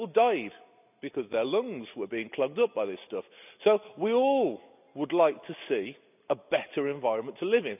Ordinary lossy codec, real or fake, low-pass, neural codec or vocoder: none; real; 3.6 kHz; none